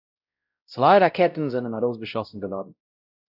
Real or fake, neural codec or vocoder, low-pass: fake; codec, 16 kHz, 0.5 kbps, X-Codec, WavLM features, trained on Multilingual LibriSpeech; 5.4 kHz